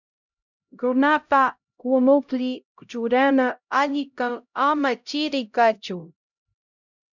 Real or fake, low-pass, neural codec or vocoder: fake; 7.2 kHz; codec, 16 kHz, 0.5 kbps, X-Codec, HuBERT features, trained on LibriSpeech